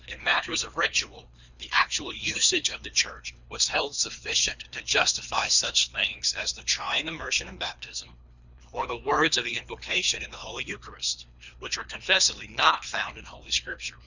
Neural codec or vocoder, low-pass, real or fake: codec, 24 kHz, 3 kbps, HILCodec; 7.2 kHz; fake